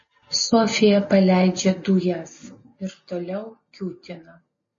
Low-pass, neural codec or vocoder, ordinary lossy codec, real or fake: 7.2 kHz; none; MP3, 32 kbps; real